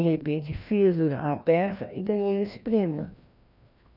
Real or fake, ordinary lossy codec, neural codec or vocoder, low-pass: fake; none; codec, 16 kHz, 1 kbps, FreqCodec, larger model; 5.4 kHz